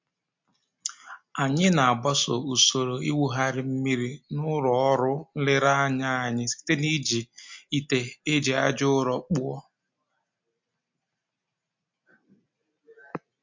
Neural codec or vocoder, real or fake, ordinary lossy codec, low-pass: none; real; MP3, 48 kbps; 7.2 kHz